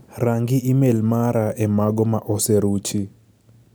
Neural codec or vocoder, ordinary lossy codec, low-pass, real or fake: none; none; none; real